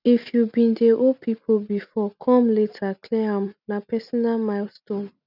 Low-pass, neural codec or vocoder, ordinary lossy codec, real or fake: 5.4 kHz; none; none; real